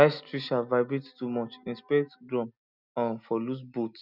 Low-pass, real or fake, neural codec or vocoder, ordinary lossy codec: 5.4 kHz; real; none; none